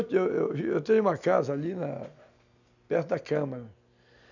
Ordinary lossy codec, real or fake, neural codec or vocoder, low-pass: none; real; none; 7.2 kHz